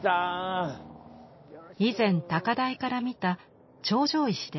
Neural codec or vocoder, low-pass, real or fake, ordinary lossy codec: none; 7.2 kHz; real; MP3, 24 kbps